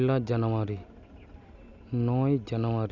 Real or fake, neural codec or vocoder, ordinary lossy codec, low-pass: real; none; none; 7.2 kHz